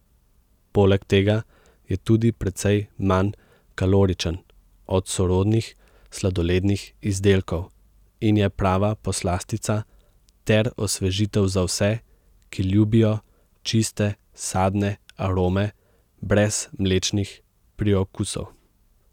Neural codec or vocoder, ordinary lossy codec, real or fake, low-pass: none; none; real; 19.8 kHz